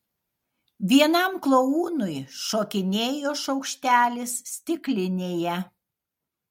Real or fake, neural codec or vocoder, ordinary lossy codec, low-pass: real; none; MP3, 64 kbps; 19.8 kHz